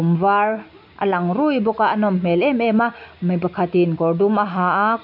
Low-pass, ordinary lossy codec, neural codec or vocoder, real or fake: 5.4 kHz; MP3, 48 kbps; none; real